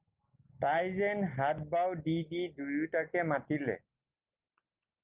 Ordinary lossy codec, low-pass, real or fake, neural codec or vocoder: Opus, 32 kbps; 3.6 kHz; real; none